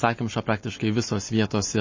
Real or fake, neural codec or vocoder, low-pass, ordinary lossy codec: real; none; 7.2 kHz; MP3, 32 kbps